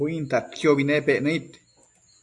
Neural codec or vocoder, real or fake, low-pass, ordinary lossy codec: none; real; 10.8 kHz; AAC, 64 kbps